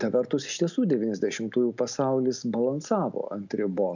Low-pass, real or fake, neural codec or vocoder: 7.2 kHz; real; none